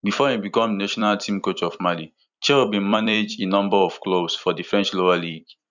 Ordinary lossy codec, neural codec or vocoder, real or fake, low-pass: none; vocoder, 44.1 kHz, 128 mel bands every 256 samples, BigVGAN v2; fake; 7.2 kHz